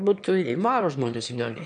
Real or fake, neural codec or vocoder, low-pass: fake; autoencoder, 22.05 kHz, a latent of 192 numbers a frame, VITS, trained on one speaker; 9.9 kHz